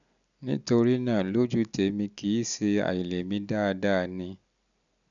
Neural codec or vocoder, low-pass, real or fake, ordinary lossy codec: none; 7.2 kHz; real; none